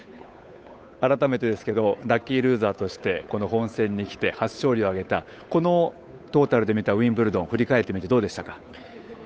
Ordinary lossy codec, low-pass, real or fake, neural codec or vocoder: none; none; fake; codec, 16 kHz, 8 kbps, FunCodec, trained on Chinese and English, 25 frames a second